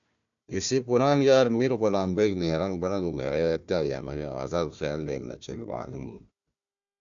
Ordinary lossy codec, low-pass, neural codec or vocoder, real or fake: MP3, 96 kbps; 7.2 kHz; codec, 16 kHz, 1 kbps, FunCodec, trained on Chinese and English, 50 frames a second; fake